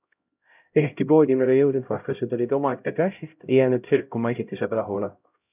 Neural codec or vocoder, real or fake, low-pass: codec, 16 kHz, 0.5 kbps, X-Codec, HuBERT features, trained on LibriSpeech; fake; 3.6 kHz